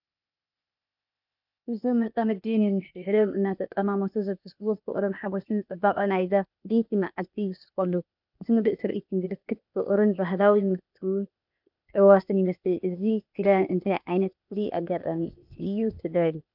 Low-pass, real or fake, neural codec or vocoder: 5.4 kHz; fake; codec, 16 kHz, 0.8 kbps, ZipCodec